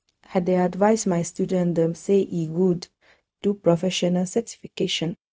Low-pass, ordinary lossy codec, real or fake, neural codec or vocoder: none; none; fake; codec, 16 kHz, 0.4 kbps, LongCat-Audio-Codec